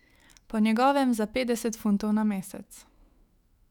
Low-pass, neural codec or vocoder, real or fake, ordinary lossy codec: 19.8 kHz; autoencoder, 48 kHz, 128 numbers a frame, DAC-VAE, trained on Japanese speech; fake; none